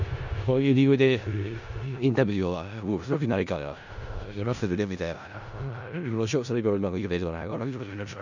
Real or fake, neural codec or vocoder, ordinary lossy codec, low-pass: fake; codec, 16 kHz in and 24 kHz out, 0.4 kbps, LongCat-Audio-Codec, four codebook decoder; none; 7.2 kHz